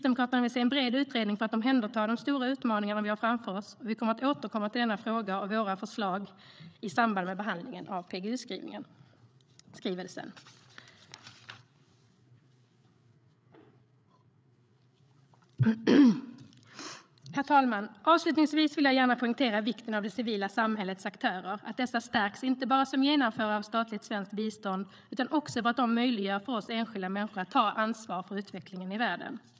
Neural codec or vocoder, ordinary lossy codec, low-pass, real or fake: codec, 16 kHz, 8 kbps, FreqCodec, larger model; none; none; fake